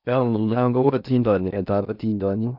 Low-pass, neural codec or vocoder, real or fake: 5.4 kHz; codec, 16 kHz in and 24 kHz out, 0.6 kbps, FocalCodec, streaming, 4096 codes; fake